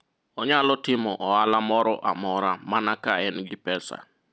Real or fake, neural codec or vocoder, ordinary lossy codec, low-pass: real; none; none; none